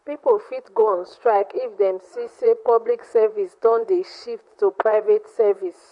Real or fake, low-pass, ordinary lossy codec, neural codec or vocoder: fake; 10.8 kHz; MP3, 48 kbps; vocoder, 44.1 kHz, 128 mel bands, Pupu-Vocoder